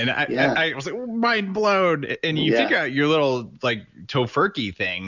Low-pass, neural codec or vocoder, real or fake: 7.2 kHz; none; real